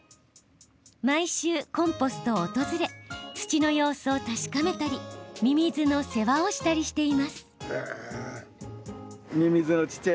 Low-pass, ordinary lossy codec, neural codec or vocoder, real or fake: none; none; none; real